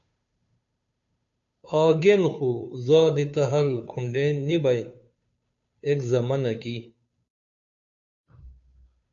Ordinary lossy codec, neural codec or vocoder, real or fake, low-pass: AAC, 64 kbps; codec, 16 kHz, 2 kbps, FunCodec, trained on Chinese and English, 25 frames a second; fake; 7.2 kHz